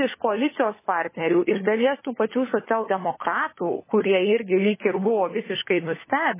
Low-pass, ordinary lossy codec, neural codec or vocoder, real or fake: 3.6 kHz; MP3, 16 kbps; codec, 16 kHz, 16 kbps, FunCodec, trained on LibriTTS, 50 frames a second; fake